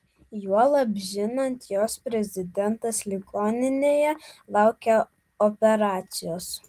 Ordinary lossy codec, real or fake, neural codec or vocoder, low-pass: Opus, 24 kbps; real; none; 14.4 kHz